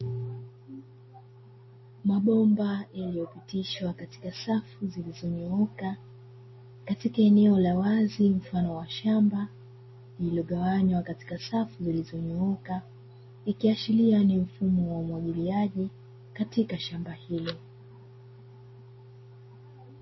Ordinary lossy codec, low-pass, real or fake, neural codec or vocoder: MP3, 24 kbps; 7.2 kHz; real; none